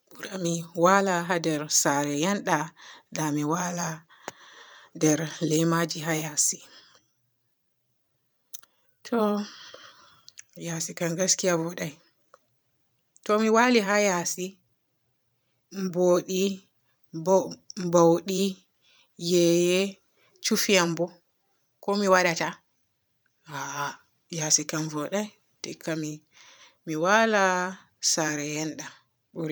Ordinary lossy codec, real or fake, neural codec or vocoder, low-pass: none; fake; vocoder, 44.1 kHz, 128 mel bands every 512 samples, BigVGAN v2; none